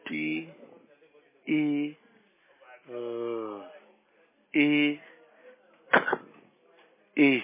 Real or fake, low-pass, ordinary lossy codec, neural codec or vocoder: real; 3.6 kHz; MP3, 16 kbps; none